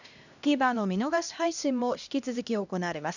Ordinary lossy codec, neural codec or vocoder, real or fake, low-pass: none; codec, 16 kHz, 1 kbps, X-Codec, HuBERT features, trained on LibriSpeech; fake; 7.2 kHz